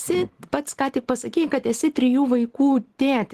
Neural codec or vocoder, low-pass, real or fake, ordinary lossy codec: none; 14.4 kHz; real; Opus, 16 kbps